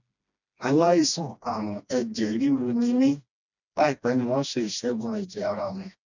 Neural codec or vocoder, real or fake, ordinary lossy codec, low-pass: codec, 16 kHz, 1 kbps, FreqCodec, smaller model; fake; none; 7.2 kHz